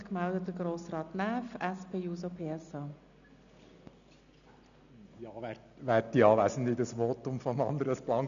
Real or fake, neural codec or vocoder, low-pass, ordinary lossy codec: real; none; 7.2 kHz; none